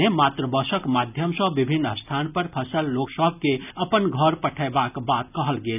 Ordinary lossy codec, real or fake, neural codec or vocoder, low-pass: none; real; none; 3.6 kHz